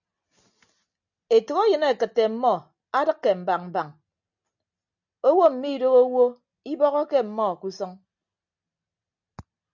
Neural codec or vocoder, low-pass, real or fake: none; 7.2 kHz; real